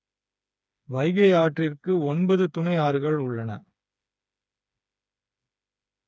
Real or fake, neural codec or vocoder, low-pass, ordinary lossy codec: fake; codec, 16 kHz, 4 kbps, FreqCodec, smaller model; none; none